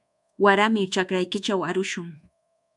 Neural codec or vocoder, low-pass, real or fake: codec, 24 kHz, 1.2 kbps, DualCodec; 10.8 kHz; fake